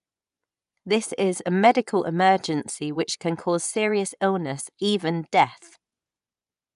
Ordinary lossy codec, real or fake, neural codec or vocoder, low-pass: none; fake; vocoder, 24 kHz, 100 mel bands, Vocos; 10.8 kHz